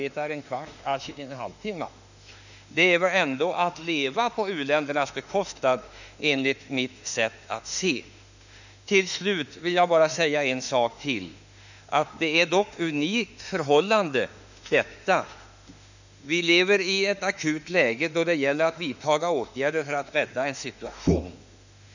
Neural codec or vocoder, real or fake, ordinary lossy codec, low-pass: autoencoder, 48 kHz, 32 numbers a frame, DAC-VAE, trained on Japanese speech; fake; none; 7.2 kHz